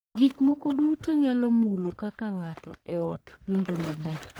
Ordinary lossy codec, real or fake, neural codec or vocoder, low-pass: none; fake; codec, 44.1 kHz, 1.7 kbps, Pupu-Codec; none